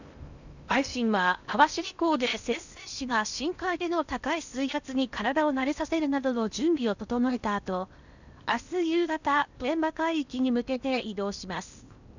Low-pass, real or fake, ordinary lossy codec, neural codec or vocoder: 7.2 kHz; fake; none; codec, 16 kHz in and 24 kHz out, 0.8 kbps, FocalCodec, streaming, 65536 codes